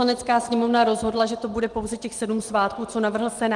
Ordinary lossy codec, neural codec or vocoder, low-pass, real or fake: Opus, 24 kbps; none; 10.8 kHz; real